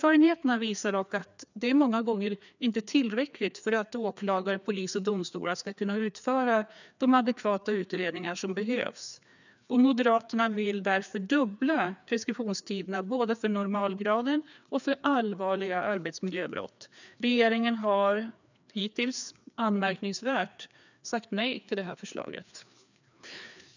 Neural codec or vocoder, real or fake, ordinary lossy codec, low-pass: codec, 16 kHz, 2 kbps, FreqCodec, larger model; fake; none; 7.2 kHz